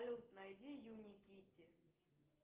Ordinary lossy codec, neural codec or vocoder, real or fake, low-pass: Opus, 16 kbps; none; real; 3.6 kHz